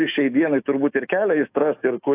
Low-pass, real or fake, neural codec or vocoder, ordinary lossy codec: 3.6 kHz; fake; autoencoder, 48 kHz, 128 numbers a frame, DAC-VAE, trained on Japanese speech; AAC, 24 kbps